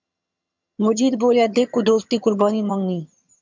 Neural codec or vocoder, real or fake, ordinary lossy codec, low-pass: vocoder, 22.05 kHz, 80 mel bands, HiFi-GAN; fake; MP3, 64 kbps; 7.2 kHz